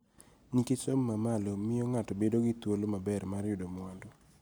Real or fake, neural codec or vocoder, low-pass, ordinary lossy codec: real; none; none; none